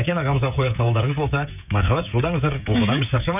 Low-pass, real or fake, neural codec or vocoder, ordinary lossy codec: 3.6 kHz; fake; codec, 16 kHz, 16 kbps, FreqCodec, smaller model; none